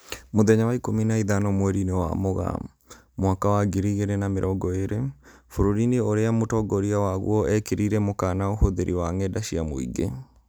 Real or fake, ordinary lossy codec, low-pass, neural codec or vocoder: real; none; none; none